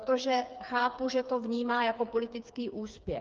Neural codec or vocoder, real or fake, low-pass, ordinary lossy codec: codec, 16 kHz, 4 kbps, FreqCodec, smaller model; fake; 7.2 kHz; Opus, 24 kbps